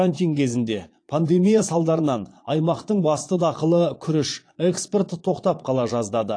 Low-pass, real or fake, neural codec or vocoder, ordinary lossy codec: 9.9 kHz; fake; vocoder, 22.05 kHz, 80 mel bands, Vocos; AAC, 48 kbps